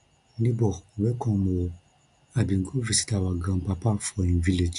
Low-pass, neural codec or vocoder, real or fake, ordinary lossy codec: 10.8 kHz; none; real; none